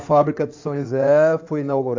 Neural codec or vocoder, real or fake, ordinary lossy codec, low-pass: codec, 16 kHz in and 24 kHz out, 2.2 kbps, FireRedTTS-2 codec; fake; AAC, 48 kbps; 7.2 kHz